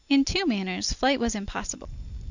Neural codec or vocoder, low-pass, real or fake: none; 7.2 kHz; real